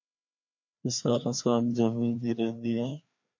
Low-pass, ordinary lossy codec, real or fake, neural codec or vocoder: 7.2 kHz; MP3, 48 kbps; fake; codec, 16 kHz, 2 kbps, FreqCodec, larger model